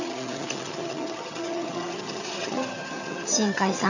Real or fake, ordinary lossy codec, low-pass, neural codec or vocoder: fake; none; 7.2 kHz; vocoder, 22.05 kHz, 80 mel bands, HiFi-GAN